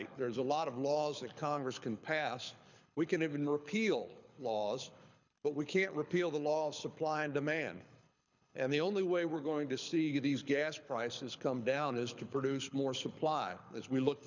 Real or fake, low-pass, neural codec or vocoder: fake; 7.2 kHz; codec, 24 kHz, 6 kbps, HILCodec